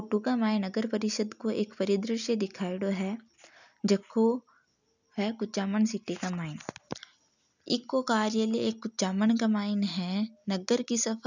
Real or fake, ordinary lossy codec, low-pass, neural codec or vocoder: real; none; 7.2 kHz; none